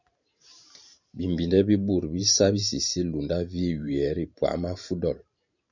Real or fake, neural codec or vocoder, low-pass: fake; vocoder, 44.1 kHz, 128 mel bands every 512 samples, BigVGAN v2; 7.2 kHz